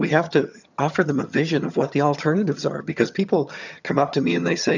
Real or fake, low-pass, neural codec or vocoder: fake; 7.2 kHz; vocoder, 22.05 kHz, 80 mel bands, HiFi-GAN